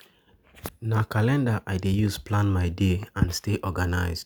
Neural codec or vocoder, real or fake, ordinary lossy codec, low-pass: none; real; none; none